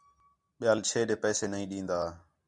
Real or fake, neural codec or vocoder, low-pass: real; none; 10.8 kHz